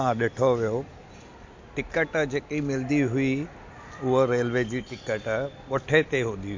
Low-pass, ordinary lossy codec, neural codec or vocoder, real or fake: 7.2 kHz; MP3, 48 kbps; none; real